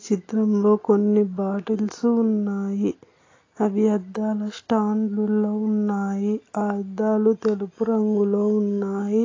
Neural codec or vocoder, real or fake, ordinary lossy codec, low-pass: none; real; AAC, 32 kbps; 7.2 kHz